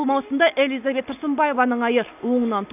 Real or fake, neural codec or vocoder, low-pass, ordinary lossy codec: real; none; 3.6 kHz; none